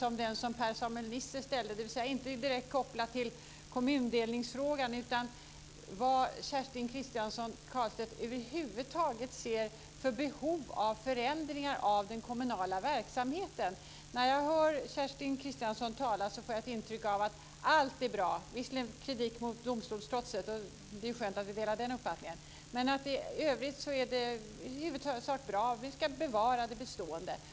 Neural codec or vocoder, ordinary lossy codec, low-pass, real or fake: none; none; none; real